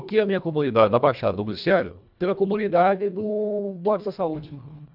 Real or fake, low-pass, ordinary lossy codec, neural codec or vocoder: fake; 5.4 kHz; none; codec, 24 kHz, 1.5 kbps, HILCodec